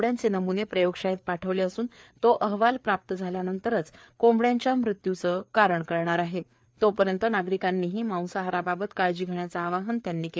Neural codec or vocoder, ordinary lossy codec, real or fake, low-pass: codec, 16 kHz, 4 kbps, FreqCodec, larger model; none; fake; none